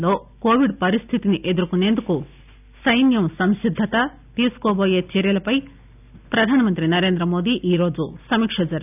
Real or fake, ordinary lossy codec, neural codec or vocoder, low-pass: real; none; none; 3.6 kHz